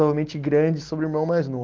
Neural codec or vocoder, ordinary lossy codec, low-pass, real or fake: none; Opus, 16 kbps; 7.2 kHz; real